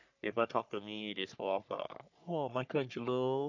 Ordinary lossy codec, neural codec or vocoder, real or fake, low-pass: none; codec, 44.1 kHz, 3.4 kbps, Pupu-Codec; fake; 7.2 kHz